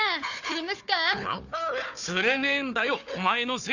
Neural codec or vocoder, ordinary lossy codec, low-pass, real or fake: codec, 16 kHz, 4 kbps, FunCodec, trained on Chinese and English, 50 frames a second; none; 7.2 kHz; fake